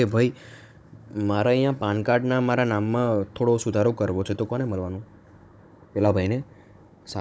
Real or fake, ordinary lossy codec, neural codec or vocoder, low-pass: fake; none; codec, 16 kHz, 16 kbps, FunCodec, trained on Chinese and English, 50 frames a second; none